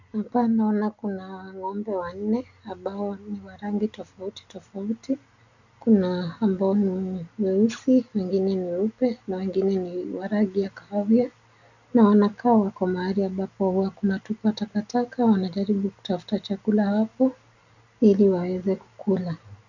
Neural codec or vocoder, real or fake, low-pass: none; real; 7.2 kHz